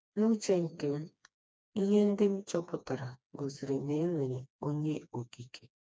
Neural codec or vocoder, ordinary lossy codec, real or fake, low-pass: codec, 16 kHz, 2 kbps, FreqCodec, smaller model; none; fake; none